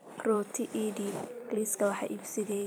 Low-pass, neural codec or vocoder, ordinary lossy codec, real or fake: none; vocoder, 44.1 kHz, 128 mel bands every 256 samples, BigVGAN v2; none; fake